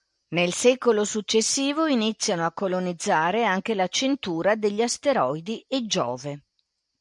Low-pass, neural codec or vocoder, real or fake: 10.8 kHz; none; real